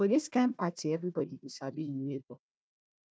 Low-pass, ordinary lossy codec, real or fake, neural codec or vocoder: none; none; fake; codec, 16 kHz, 1 kbps, FunCodec, trained on Chinese and English, 50 frames a second